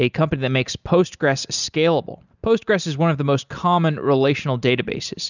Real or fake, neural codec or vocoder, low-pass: real; none; 7.2 kHz